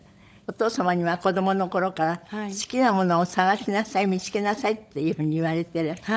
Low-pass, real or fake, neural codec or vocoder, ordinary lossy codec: none; fake; codec, 16 kHz, 16 kbps, FunCodec, trained on LibriTTS, 50 frames a second; none